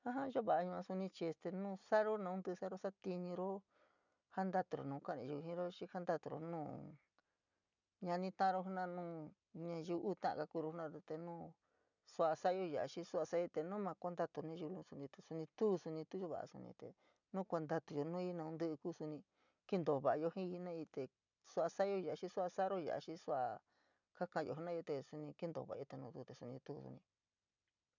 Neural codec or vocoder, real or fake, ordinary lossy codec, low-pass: none; real; none; 7.2 kHz